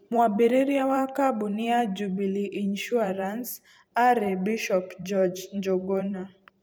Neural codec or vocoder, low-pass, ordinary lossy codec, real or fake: vocoder, 44.1 kHz, 128 mel bands every 512 samples, BigVGAN v2; none; none; fake